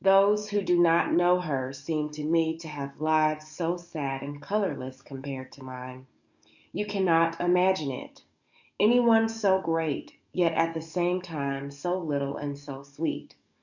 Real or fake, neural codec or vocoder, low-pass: fake; codec, 44.1 kHz, 7.8 kbps, DAC; 7.2 kHz